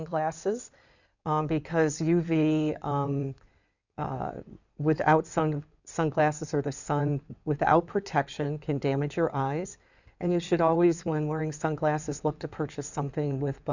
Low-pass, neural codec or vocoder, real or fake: 7.2 kHz; codec, 16 kHz in and 24 kHz out, 2.2 kbps, FireRedTTS-2 codec; fake